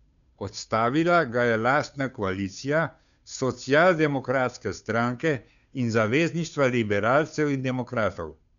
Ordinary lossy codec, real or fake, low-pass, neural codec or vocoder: none; fake; 7.2 kHz; codec, 16 kHz, 2 kbps, FunCodec, trained on Chinese and English, 25 frames a second